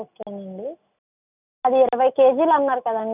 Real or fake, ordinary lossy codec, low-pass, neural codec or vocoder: real; none; 3.6 kHz; none